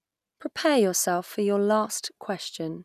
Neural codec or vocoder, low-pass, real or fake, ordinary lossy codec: none; 14.4 kHz; real; none